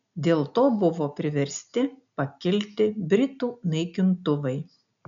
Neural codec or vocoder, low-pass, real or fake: none; 7.2 kHz; real